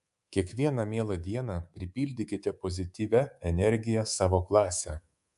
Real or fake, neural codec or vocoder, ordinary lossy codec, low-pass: fake; codec, 24 kHz, 3.1 kbps, DualCodec; AAC, 96 kbps; 10.8 kHz